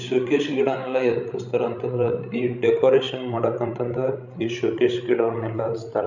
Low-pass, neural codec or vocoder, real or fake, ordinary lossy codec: 7.2 kHz; codec, 16 kHz, 16 kbps, FreqCodec, larger model; fake; none